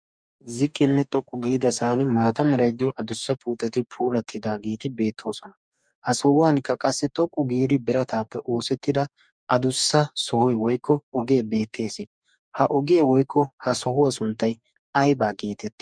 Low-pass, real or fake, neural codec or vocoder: 9.9 kHz; fake; codec, 44.1 kHz, 2.6 kbps, DAC